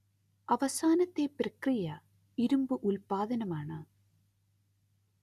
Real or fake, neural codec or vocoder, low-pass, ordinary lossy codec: real; none; 14.4 kHz; Opus, 64 kbps